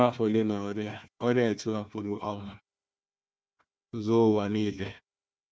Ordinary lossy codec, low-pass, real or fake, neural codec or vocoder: none; none; fake; codec, 16 kHz, 1 kbps, FunCodec, trained on Chinese and English, 50 frames a second